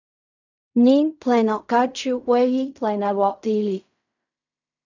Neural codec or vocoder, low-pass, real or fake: codec, 16 kHz in and 24 kHz out, 0.4 kbps, LongCat-Audio-Codec, fine tuned four codebook decoder; 7.2 kHz; fake